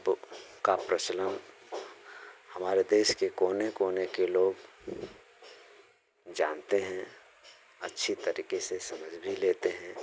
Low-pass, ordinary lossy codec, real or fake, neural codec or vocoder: none; none; real; none